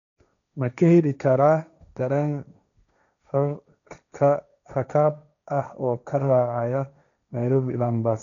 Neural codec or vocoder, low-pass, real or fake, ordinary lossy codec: codec, 16 kHz, 1.1 kbps, Voila-Tokenizer; 7.2 kHz; fake; none